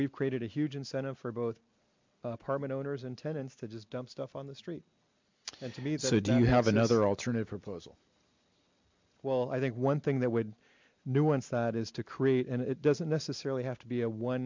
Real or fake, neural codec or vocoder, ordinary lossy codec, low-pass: real; none; MP3, 64 kbps; 7.2 kHz